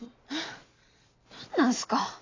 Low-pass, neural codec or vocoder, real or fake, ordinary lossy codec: 7.2 kHz; none; real; AAC, 32 kbps